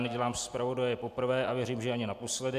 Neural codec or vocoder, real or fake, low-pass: none; real; 14.4 kHz